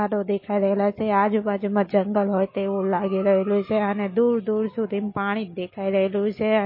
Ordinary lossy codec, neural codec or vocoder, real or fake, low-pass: MP3, 24 kbps; none; real; 5.4 kHz